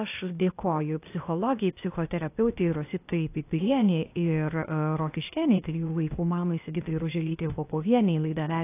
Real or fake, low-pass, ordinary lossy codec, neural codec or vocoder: fake; 3.6 kHz; AAC, 24 kbps; codec, 16 kHz, 0.8 kbps, ZipCodec